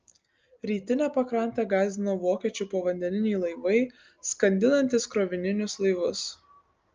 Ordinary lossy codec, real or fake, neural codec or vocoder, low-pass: Opus, 24 kbps; real; none; 7.2 kHz